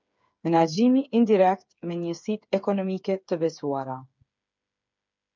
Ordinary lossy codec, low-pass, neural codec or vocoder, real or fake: MP3, 64 kbps; 7.2 kHz; codec, 16 kHz, 8 kbps, FreqCodec, smaller model; fake